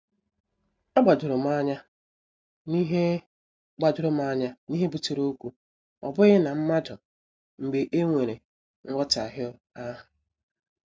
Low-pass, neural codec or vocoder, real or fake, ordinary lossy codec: none; none; real; none